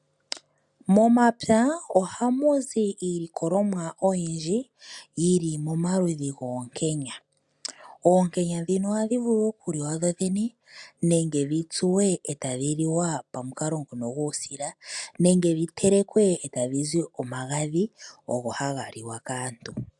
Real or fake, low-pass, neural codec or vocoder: real; 10.8 kHz; none